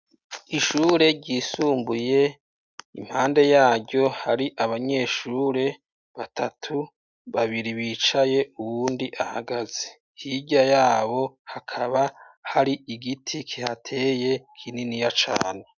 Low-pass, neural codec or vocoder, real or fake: 7.2 kHz; none; real